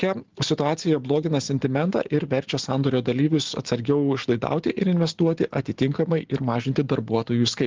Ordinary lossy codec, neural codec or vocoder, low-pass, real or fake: Opus, 16 kbps; none; 7.2 kHz; real